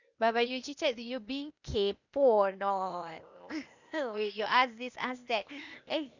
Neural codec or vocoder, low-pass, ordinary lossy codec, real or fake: codec, 16 kHz, 0.8 kbps, ZipCodec; 7.2 kHz; none; fake